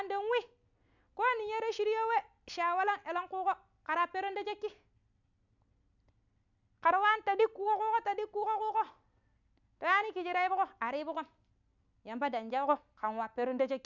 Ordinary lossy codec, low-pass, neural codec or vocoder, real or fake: none; 7.2 kHz; none; real